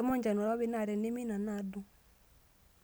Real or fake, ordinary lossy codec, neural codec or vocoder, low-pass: real; none; none; none